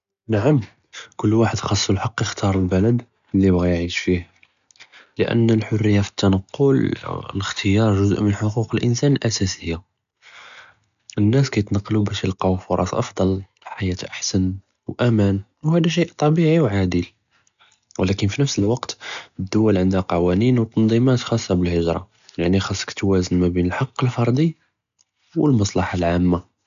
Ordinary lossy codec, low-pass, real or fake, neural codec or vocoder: none; 7.2 kHz; real; none